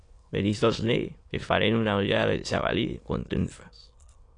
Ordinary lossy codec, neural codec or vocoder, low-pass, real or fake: AAC, 48 kbps; autoencoder, 22.05 kHz, a latent of 192 numbers a frame, VITS, trained on many speakers; 9.9 kHz; fake